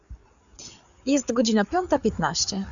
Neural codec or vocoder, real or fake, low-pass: codec, 16 kHz, 4 kbps, FreqCodec, larger model; fake; 7.2 kHz